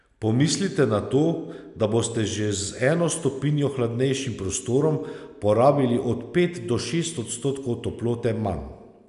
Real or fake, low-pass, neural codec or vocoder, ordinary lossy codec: real; 10.8 kHz; none; none